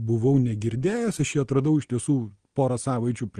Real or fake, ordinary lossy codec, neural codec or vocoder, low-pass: real; Opus, 24 kbps; none; 9.9 kHz